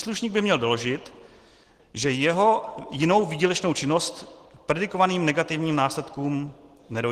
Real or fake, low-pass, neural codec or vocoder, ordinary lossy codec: real; 14.4 kHz; none; Opus, 16 kbps